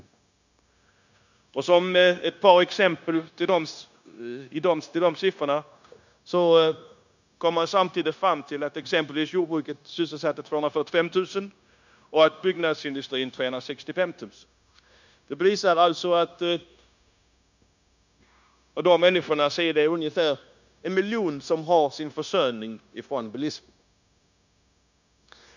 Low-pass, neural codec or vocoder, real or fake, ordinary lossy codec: 7.2 kHz; codec, 16 kHz, 0.9 kbps, LongCat-Audio-Codec; fake; none